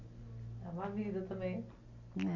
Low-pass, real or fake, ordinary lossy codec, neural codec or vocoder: 7.2 kHz; real; MP3, 48 kbps; none